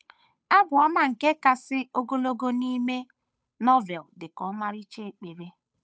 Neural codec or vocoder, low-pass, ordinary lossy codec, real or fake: codec, 16 kHz, 8 kbps, FunCodec, trained on Chinese and English, 25 frames a second; none; none; fake